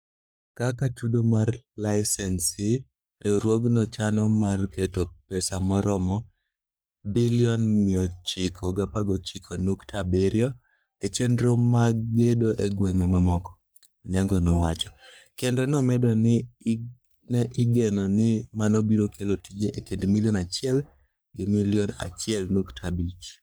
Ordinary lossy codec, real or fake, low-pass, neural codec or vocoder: none; fake; none; codec, 44.1 kHz, 3.4 kbps, Pupu-Codec